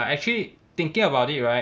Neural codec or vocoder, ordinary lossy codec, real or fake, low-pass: none; none; real; none